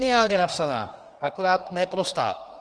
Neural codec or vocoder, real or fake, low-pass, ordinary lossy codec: codec, 24 kHz, 1 kbps, SNAC; fake; 9.9 kHz; Opus, 24 kbps